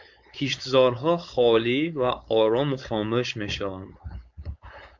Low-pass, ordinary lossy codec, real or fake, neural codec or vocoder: 7.2 kHz; MP3, 64 kbps; fake; codec, 16 kHz, 4.8 kbps, FACodec